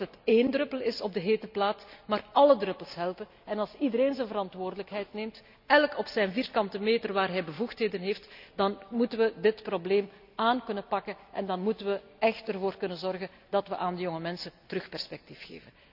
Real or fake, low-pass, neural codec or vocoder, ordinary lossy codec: real; 5.4 kHz; none; none